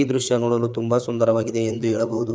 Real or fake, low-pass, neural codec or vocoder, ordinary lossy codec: fake; none; codec, 16 kHz, 4 kbps, FreqCodec, larger model; none